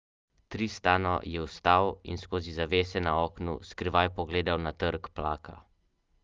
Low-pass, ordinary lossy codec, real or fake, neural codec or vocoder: 7.2 kHz; Opus, 32 kbps; real; none